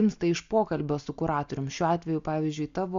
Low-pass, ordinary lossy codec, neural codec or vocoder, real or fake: 7.2 kHz; MP3, 64 kbps; none; real